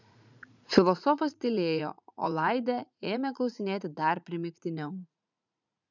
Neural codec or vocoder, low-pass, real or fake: vocoder, 44.1 kHz, 80 mel bands, Vocos; 7.2 kHz; fake